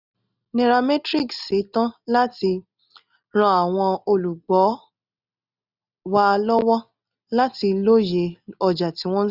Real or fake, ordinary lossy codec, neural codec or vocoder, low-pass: real; none; none; 5.4 kHz